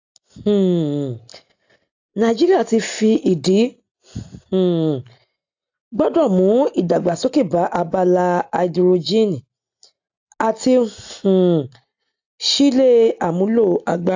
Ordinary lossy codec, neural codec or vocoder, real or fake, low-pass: AAC, 48 kbps; none; real; 7.2 kHz